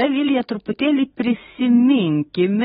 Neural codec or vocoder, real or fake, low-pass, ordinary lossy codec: codec, 44.1 kHz, 7.8 kbps, DAC; fake; 19.8 kHz; AAC, 16 kbps